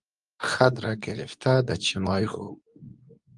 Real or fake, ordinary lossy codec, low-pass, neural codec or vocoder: fake; Opus, 32 kbps; 10.8 kHz; codec, 24 kHz, 0.9 kbps, WavTokenizer, medium speech release version 2